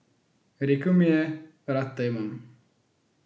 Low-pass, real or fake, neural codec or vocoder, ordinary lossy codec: none; real; none; none